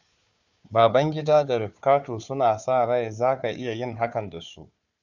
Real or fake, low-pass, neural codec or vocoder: fake; 7.2 kHz; codec, 16 kHz, 4 kbps, FunCodec, trained on Chinese and English, 50 frames a second